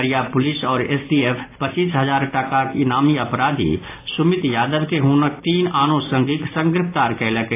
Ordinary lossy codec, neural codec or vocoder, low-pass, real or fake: AAC, 24 kbps; none; 3.6 kHz; real